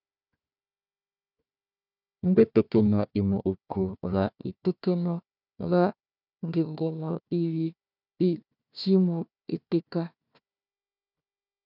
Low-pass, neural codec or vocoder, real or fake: 5.4 kHz; codec, 16 kHz, 1 kbps, FunCodec, trained on Chinese and English, 50 frames a second; fake